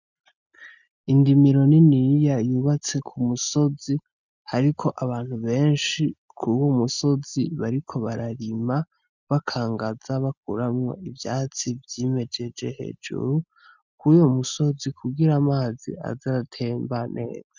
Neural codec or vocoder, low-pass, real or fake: none; 7.2 kHz; real